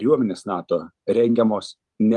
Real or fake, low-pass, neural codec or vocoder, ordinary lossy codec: fake; 10.8 kHz; vocoder, 44.1 kHz, 128 mel bands every 512 samples, BigVGAN v2; Opus, 32 kbps